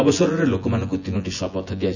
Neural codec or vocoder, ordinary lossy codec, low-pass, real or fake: vocoder, 24 kHz, 100 mel bands, Vocos; none; 7.2 kHz; fake